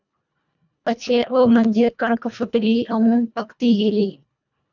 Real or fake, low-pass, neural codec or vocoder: fake; 7.2 kHz; codec, 24 kHz, 1.5 kbps, HILCodec